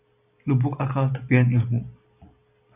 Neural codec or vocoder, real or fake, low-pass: none; real; 3.6 kHz